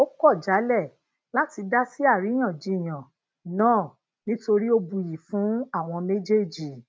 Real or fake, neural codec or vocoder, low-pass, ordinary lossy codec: real; none; none; none